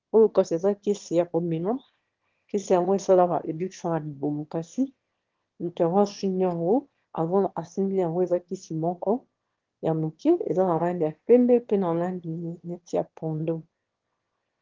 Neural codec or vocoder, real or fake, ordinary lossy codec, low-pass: autoencoder, 22.05 kHz, a latent of 192 numbers a frame, VITS, trained on one speaker; fake; Opus, 16 kbps; 7.2 kHz